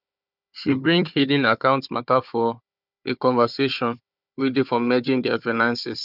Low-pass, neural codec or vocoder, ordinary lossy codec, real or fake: 5.4 kHz; codec, 16 kHz, 4 kbps, FunCodec, trained on Chinese and English, 50 frames a second; none; fake